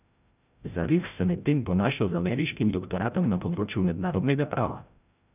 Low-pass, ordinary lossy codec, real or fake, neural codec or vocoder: 3.6 kHz; none; fake; codec, 16 kHz, 0.5 kbps, FreqCodec, larger model